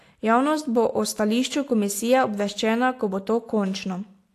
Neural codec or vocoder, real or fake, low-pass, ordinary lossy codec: none; real; 14.4 kHz; AAC, 64 kbps